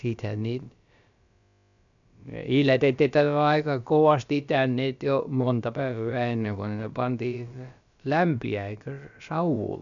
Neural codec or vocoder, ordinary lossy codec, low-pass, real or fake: codec, 16 kHz, about 1 kbps, DyCAST, with the encoder's durations; none; 7.2 kHz; fake